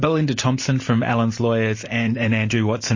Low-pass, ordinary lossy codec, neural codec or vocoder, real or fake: 7.2 kHz; MP3, 32 kbps; none; real